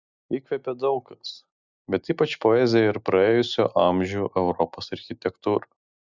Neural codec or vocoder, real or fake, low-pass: none; real; 7.2 kHz